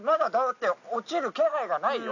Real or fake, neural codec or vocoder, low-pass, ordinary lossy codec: real; none; 7.2 kHz; none